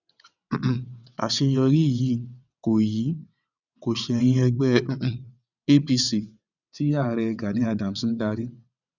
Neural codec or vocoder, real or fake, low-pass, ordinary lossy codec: vocoder, 22.05 kHz, 80 mel bands, WaveNeXt; fake; 7.2 kHz; none